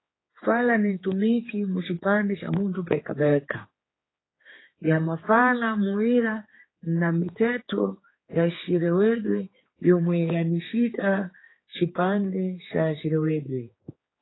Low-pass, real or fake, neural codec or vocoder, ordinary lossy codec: 7.2 kHz; fake; codec, 16 kHz, 4 kbps, X-Codec, HuBERT features, trained on general audio; AAC, 16 kbps